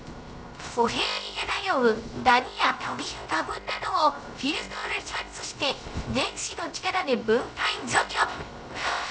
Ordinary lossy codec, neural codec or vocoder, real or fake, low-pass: none; codec, 16 kHz, 0.3 kbps, FocalCodec; fake; none